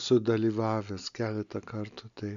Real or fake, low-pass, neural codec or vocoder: real; 7.2 kHz; none